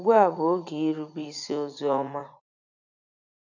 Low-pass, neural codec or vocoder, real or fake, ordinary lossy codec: 7.2 kHz; vocoder, 44.1 kHz, 80 mel bands, Vocos; fake; none